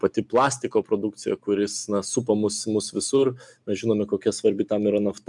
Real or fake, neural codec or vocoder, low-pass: real; none; 10.8 kHz